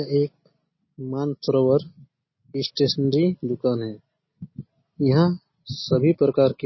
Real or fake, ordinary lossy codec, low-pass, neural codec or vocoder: real; MP3, 24 kbps; 7.2 kHz; none